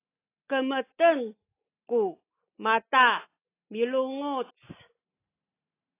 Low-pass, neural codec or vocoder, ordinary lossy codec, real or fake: 3.6 kHz; none; AAC, 24 kbps; real